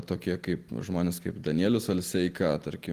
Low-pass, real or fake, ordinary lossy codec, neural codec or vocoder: 14.4 kHz; real; Opus, 24 kbps; none